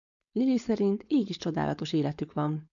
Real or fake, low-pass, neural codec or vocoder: fake; 7.2 kHz; codec, 16 kHz, 4.8 kbps, FACodec